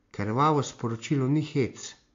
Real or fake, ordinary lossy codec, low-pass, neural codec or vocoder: real; AAC, 48 kbps; 7.2 kHz; none